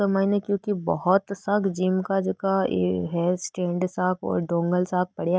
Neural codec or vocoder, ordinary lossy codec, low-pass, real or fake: none; none; none; real